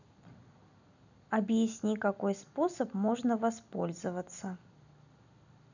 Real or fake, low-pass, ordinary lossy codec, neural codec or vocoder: real; 7.2 kHz; none; none